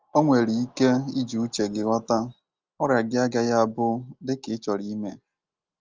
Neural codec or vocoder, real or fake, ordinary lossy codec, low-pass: none; real; Opus, 24 kbps; 7.2 kHz